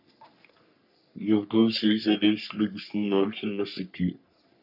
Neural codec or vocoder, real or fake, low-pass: codec, 44.1 kHz, 3.4 kbps, Pupu-Codec; fake; 5.4 kHz